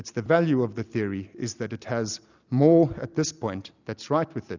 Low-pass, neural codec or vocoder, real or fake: 7.2 kHz; none; real